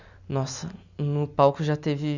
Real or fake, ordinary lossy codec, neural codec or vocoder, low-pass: real; none; none; 7.2 kHz